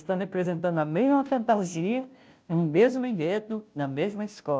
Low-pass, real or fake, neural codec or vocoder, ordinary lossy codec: none; fake; codec, 16 kHz, 0.5 kbps, FunCodec, trained on Chinese and English, 25 frames a second; none